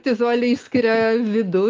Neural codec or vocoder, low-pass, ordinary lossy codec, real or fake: none; 7.2 kHz; Opus, 24 kbps; real